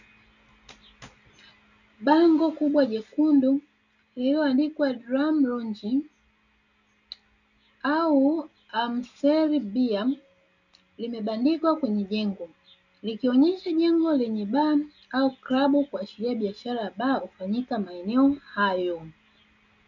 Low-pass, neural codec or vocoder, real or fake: 7.2 kHz; none; real